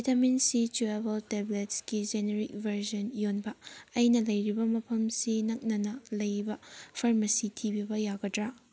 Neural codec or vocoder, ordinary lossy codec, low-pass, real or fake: none; none; none; real